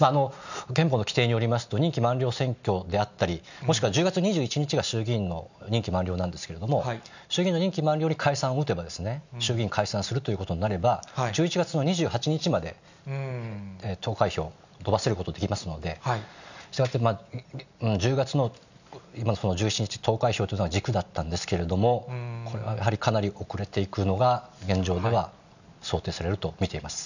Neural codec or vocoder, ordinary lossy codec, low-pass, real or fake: none; none; 7.2 kHz; real